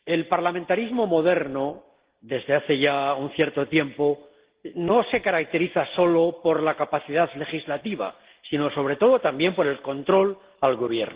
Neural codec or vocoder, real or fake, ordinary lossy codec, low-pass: none; real; Opus, 16 kbps; 3.6 kHz